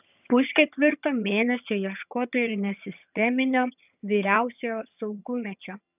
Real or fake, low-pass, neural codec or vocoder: fake; 3.6 kHz; vocoder, 22.05 kHz, 80 mel bands, HiFi-GAN